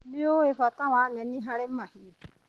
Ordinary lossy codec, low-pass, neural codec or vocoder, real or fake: Opus, 16 kbps; 19.8 kHz; none; real